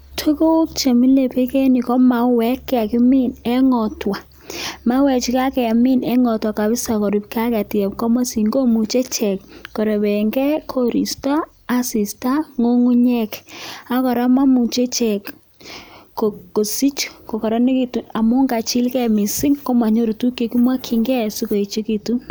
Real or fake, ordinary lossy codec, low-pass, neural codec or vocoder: real; none; none; none